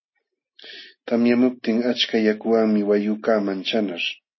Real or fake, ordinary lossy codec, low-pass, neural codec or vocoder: real; MP3, 24 kbps; 7.2 kHz; none